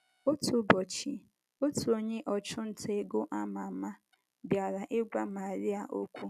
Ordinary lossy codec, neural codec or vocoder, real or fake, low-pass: none; none; real; 14.4 kHz